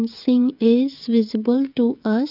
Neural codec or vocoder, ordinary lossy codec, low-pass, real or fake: codec, 16 kHz, 16 kbps, FreqCodec, smaller model; none; 5.4 kHz; fake